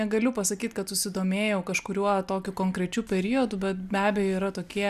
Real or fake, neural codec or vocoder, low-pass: real; none; 14.4 kHz